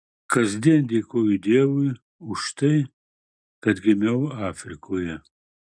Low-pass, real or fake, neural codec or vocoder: 9.9 kHz; real; none